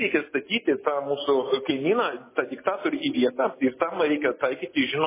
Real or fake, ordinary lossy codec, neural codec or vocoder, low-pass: real; MP3, 16 kbps; none; 3.6 kHz